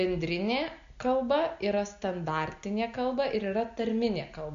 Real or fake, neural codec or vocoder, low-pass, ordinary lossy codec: real; none; 7.2 kHz; AAC, 64 kbps